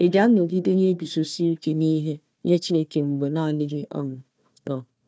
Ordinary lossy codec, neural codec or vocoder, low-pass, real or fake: none; codec, 16 kHz, 1 kbps, FunCodec, trained on Chinese and English, 50 frames a second; none; fake